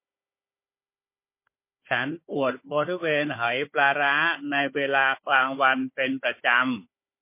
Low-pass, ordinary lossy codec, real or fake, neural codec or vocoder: 3.6 kHz; MP3, 24 kbps; fake; codec, 16 kHz, 4 kbps, FunCodec, trained on Chinese and English, 50 frames a second